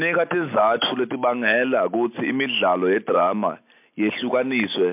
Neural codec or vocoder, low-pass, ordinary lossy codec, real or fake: none; 3.6 kHz; MP3, 32 kbps; real